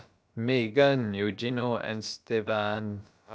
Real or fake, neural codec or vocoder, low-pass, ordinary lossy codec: fake; codec, 16 kHz, about 1 kbps, DyCAST, with the encoder's durations; none; none